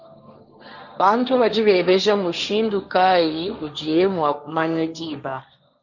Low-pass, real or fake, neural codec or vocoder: 7.2 kHz; fake; codec, 16 kHz, 1.1 kbps, Voila-Tokenizer